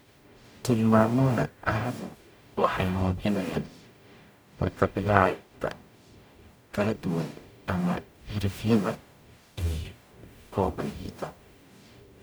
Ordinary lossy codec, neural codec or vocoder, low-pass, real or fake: none; codec, 44.1 kHz, 0.9 kbps, DAC; none; fake